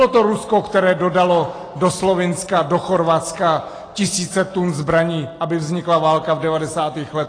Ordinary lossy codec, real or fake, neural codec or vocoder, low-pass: AAC, 32 kbps; real; none; 9.9 kHz